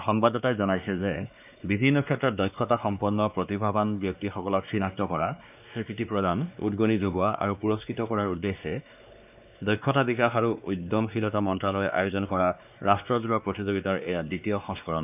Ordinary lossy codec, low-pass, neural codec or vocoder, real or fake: none; 3.6 kHz; codec, 16 kHz, 2 kbps, X-Codec, WavLM features, trained on Multilingual LibriSpeech; fake